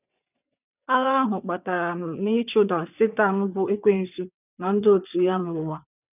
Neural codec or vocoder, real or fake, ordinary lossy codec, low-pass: vocoder, 22.05 kHz, 80 mel bands, WaveNeXt; fake; none; 3.6 kHz